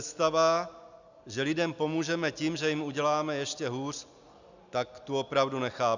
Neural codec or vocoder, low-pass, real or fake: none; 7.2 kHz; real